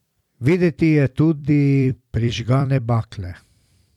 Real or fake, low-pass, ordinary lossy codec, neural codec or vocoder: fake; 19.8 kHz; none; vocoder, 44.1 kHz, 128 mel bands every 256 samples, BigVGAN v2